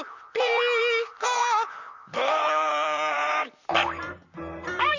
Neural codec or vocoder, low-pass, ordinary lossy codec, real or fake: vocoder, 44.1 kHz, 128 mel bands, Pupu-Vocoder; 7.2 kHz; Opus, 64 kbps; fake